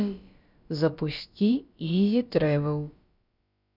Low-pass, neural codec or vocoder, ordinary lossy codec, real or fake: 5.4 kHz; codec, 16 kHz, about 1 kbps, DyCAST, with the encoder's durations; none; fake